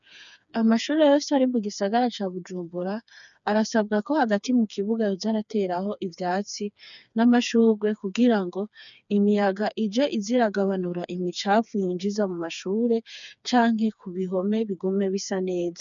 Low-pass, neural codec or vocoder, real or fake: 7.2 kHz; codec, 16 kHz, 4 kbps, FreqCodec, smaller model; fake